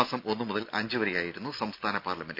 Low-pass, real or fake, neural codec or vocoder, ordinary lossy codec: 5.4 kHz; real; none; none